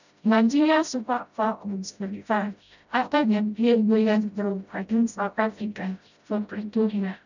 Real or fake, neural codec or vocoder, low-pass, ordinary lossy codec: fake; codec, 16 kHz, 0.5 kbps, FreqCodec, smaller model; 7.2 kHz; none